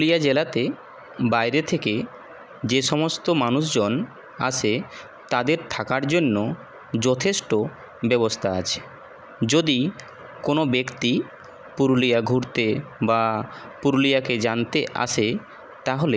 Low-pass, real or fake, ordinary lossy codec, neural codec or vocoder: none; real; none; none